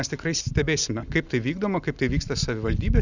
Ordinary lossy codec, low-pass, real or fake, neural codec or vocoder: Opus, 64 kbps; 7.2 kHz; real; none